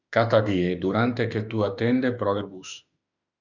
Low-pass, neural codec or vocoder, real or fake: 7.2 kHz; autoencoder, 48 kHz, 32 numbers a frame, DAC-VAE, trained on Japanese speech; fake